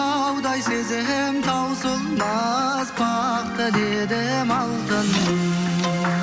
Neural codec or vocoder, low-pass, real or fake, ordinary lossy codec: none; none; real; none